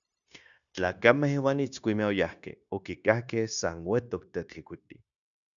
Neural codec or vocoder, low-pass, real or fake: codec, 16 kHz, 0.9 kbps, LongCat-Audio-Codec; 7.2 kHz; fake